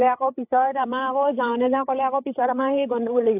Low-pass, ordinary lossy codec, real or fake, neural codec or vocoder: 3.6 kHz; AAC, 32 kbps; fake; codec, 16 kHz, 8 kbps, FreqCodec, larger model